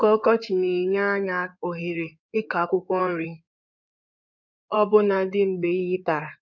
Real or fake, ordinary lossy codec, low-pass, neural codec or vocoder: fake; none; 7.2 kHz; codec, 16 kHz in and 24 kHz out, 2.2 kbps, FireRedTTS-2 codec